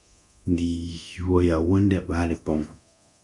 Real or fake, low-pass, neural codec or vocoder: fake; 10.8 kHz; codec, 24 kHz, 0.9 kbps, DualCodec